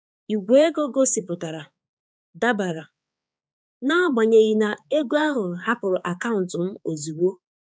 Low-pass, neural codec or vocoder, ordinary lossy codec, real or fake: none; codec, 16 kHz, 4 kbps, X-Codec, HuBERT features, trained on balanced general audio; none; fake